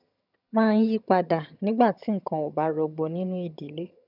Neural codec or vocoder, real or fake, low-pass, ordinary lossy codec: vocoder, 22.05 kHz, 80 mel bands, HiFi-GAN; fake; 5.4 kHz; none